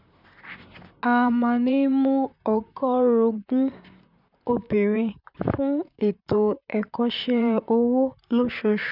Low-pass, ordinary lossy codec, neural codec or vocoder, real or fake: 5.4 kHz; none; vocoder, 44.1 kHz, 128 mel bands, Pupu-Vocoder; fake